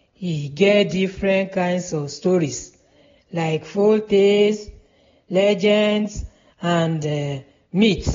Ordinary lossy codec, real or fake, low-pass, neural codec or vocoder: AAC, 24 kbps; real; 7.2 kHz; none